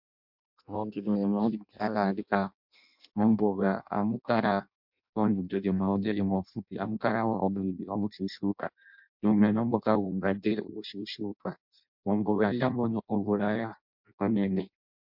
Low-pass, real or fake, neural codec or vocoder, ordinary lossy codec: 5.4 kHz; fake; codec, 16 kHz in and 24 kHz out, 0.6 kbps, FireRedTTS-2 codec; MP3, 48 kbps